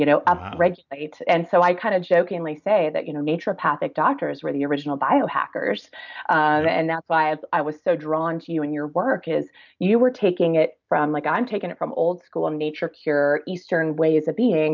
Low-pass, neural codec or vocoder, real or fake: 7.2 kHz; none; real